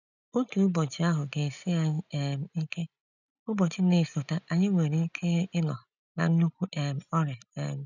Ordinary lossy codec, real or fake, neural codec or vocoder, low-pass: none; real; none; 7.2 kHz